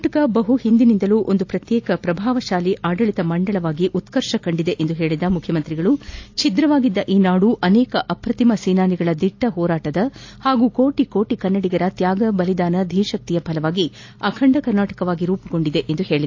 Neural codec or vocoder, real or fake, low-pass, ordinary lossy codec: none; real; 7.2 kHz; AAC, 48 kbps